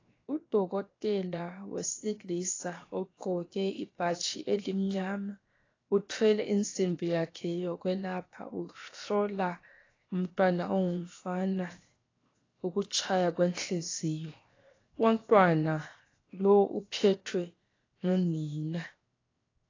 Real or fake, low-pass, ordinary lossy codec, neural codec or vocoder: fake; 7.2 kHz; AAC, 32 kbps; codec, 16 kHz, 0.7 kbps, FocalCodec